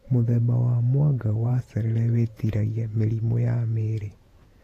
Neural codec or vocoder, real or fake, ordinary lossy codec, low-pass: vocoder, 48 kHz, 128 mel bands, Vocos; fake; AAC, 48 kbps; 14.4 kHz